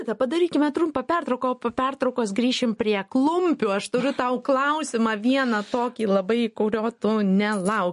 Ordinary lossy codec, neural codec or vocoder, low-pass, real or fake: MP3, 48 kbps; none; 14.4 kHz; real